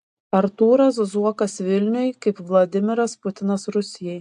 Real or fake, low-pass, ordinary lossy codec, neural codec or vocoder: real; 10.8 kHz; AAC, 64 kbps; none